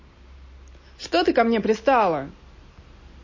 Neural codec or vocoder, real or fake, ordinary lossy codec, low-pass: none; real; MP3, 32 kbps; 7.2 kHz